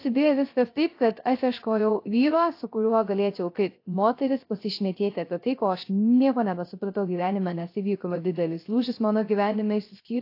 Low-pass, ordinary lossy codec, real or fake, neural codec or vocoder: 5.4 kHz; AAC, 32 kbps; fake; codec, 16 kHz, 0.3 kbps, FocalCodec